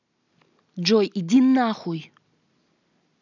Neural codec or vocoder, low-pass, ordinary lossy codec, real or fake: none; 7.2 kHz; none; real